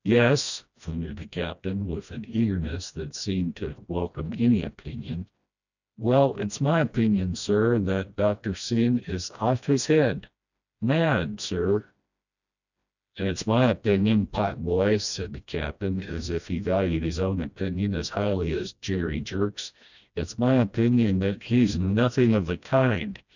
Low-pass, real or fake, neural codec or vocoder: 7.2 kHz; fake; codec, 16 kHz, 1 kbps, FreqCodec, smaller model